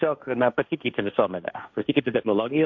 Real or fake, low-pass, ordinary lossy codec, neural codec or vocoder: fake; 7.2 kHz; MP3, 64 kbps; codec, 16 kHz, 1.1 kbps, Voila-Tokenizer